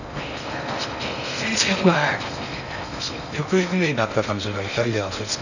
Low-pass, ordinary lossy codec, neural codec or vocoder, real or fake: 7.2 kHz; none; codec, 16 kHz in and 24 kHz out, 0.6 kbps, FocalCodec, streaming, 4096 codes; fake